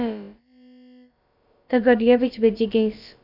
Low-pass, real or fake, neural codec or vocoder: 5.4 kHz; fake; codec, 16 kHz, about 1 kbps, DyCAST, with the encoder's durations